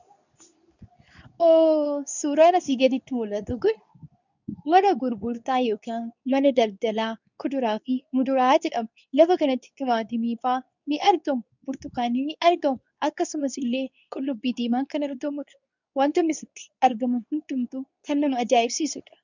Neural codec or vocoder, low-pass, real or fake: codec, 24 kHz, 0.9 kbps, WavTokenizer, medium speech release version 2; 7.2 kHz; fake